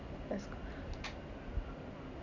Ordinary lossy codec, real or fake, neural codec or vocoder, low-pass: none; real; none; 7.2 kHz